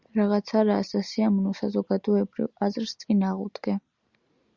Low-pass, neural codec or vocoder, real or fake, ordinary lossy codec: 7.2 kHz; none; real; Opus, 64 kbps